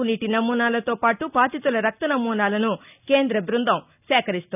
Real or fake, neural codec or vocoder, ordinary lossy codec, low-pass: real; none; none; 3.6 kHz